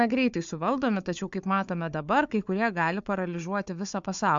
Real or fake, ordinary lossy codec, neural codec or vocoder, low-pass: fake; MP3, 64 kbps; codec, 16 kHz, 4 kbps, FunCodec, trained on Chinese and English, 50 frames a second; 7.2 kHz